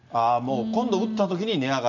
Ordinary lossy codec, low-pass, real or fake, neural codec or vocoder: none; 7.2 kHz; real; none